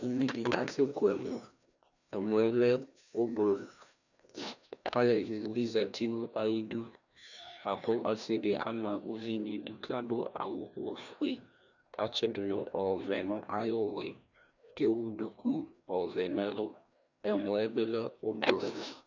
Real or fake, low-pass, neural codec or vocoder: fake; 7.2 kHz; codec, 16 kHz, 1 kbps, FreqCodec, larger model